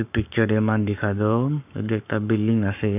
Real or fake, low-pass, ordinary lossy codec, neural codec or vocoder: fake; 3.6 kHz; none; codec, 44.1 kHz, 7.8 kbps, Pupu-Codec